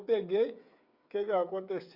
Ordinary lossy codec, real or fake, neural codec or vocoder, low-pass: Opus, 64 kbps; fake; codec, 16 kHz, 8 kbps, FreqCodec, smaller model; 5.4 kHz